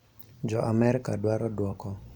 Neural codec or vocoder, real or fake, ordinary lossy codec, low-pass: vocoder, 44.1 kHz, 128 mel bands every 256 samples, BigVGAN v2; fake; none; 19.8 kHz